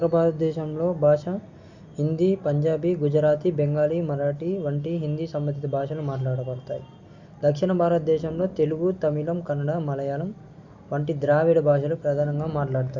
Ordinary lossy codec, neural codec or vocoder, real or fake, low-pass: Opus, 64 kbps; none; real; 7.2 kHz